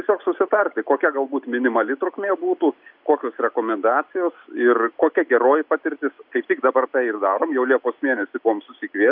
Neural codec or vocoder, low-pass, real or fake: none; 5.4 kHz; real